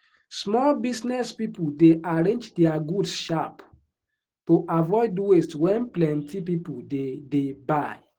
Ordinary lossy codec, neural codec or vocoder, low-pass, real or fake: Opus, 16 kbps; autoencoder, 48 kHz, 128 numbers a frame, DAC-VAE, trained on Japanese speech; 19.8 kHz; fake